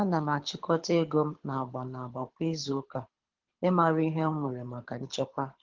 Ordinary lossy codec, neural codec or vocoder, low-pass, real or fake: Opus, 16 kbps; codec, 24 kHz, 6 kbps, HILCodec; 7.2 kHz; fake